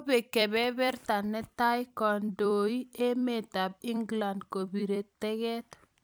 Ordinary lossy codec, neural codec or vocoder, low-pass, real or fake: none; vocoder, 44.1 kHz, 128 mel bands every 256 samples, BigVGAN v2; none; fake